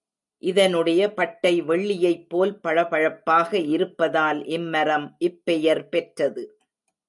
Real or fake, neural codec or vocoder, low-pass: real; none; 10.8 kHz